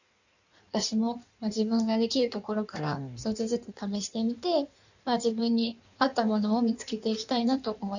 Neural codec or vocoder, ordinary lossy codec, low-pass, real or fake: codec, 16 kHz in and 24 kHz out, 1.1 kbps, FireRedTTS-2 codec; none; 7.2 kHz; fake